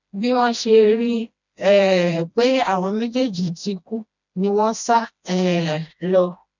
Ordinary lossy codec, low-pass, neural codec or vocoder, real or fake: none; 7.2 kHz; codec, 16 kHz, 1 kbps, FreqCodec, smaller model; fake